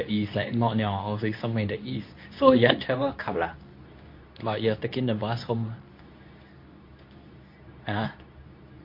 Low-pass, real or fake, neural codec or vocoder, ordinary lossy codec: 5.4 kHz; fake; codec, 24 kHz, 0.9 kbps, WavTokenizer, medium speech release version 2; MP3, 32 kbps